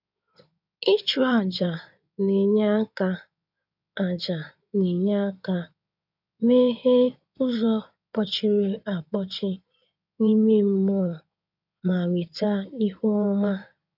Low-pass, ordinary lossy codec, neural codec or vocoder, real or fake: 5.4 kHz; none; codec, 16 kHz in and 24 kHz out, 2.2 kbps, FireRedTTS-2 codec; fake